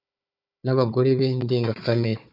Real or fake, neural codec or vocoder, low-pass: fake; codec, 16 kHz, 4 kbps, FunCodec, trained on Chinese and English, 50 frames a second; 5.4 kHz